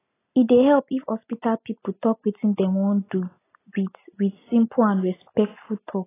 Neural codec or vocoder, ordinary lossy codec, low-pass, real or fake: none; AAC, 16 kbps; 3.6 kHz; real